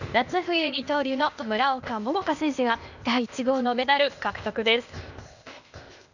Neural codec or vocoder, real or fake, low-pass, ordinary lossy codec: codec, 16 kHz, 0.8 kbps, ZipCodec; fake; 7.2 kHz; none